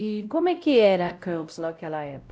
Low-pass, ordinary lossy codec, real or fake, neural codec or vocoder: none; none; fake; codec, 16 kHz, 0.5 kbps, X-Codec, WavLM features, trained on Multilingual LibriSpeech